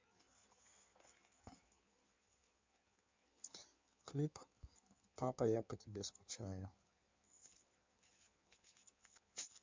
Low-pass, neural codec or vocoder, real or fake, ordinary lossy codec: 7.2 kHz; codec, 16 kHz in and 24 kHz out, 1.1 kbps, FireRedTTS-2 codec; fake; MP3, 64 kbps